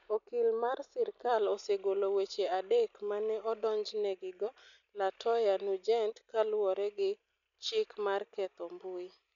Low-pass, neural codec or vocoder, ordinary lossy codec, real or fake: 7.2 kHz; none; none; real